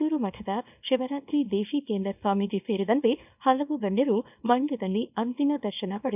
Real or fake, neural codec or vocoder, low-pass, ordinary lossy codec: fake; codec, 24 kHz, 0.9 kbps, WavTokenizer, small release; 3.6 kHz; none